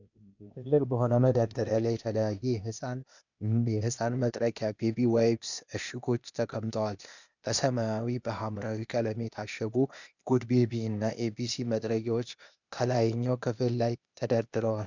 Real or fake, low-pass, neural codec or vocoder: fake; 7.2 kHz; codec, 16 kHz, 0.8 kbps, ZipCodec